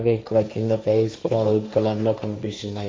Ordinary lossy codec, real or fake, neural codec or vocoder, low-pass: none; fake; codec, 16 kHz, 1.1 kbps, Voila-Tokenizer; none